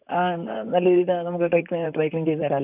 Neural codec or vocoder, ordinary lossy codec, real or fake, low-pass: vocoder, 44.1 kHz, 128 mel bands, Pupu-Vocoder; none; fake; 3.6 kHz